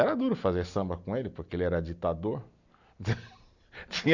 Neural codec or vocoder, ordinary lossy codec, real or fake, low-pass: none; none; real; 7.2 kHz